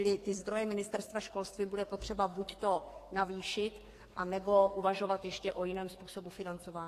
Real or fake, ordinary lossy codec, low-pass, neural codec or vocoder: fake; AAC, 48 kbps; 14.4 kHz; codec, 44.1 kHz, 2.6 kbps, SNAC